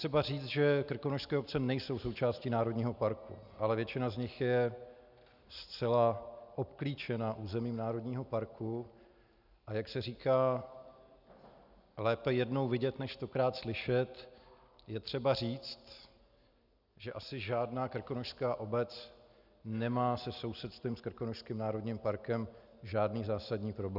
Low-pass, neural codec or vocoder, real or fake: 5.4 kHz; none; real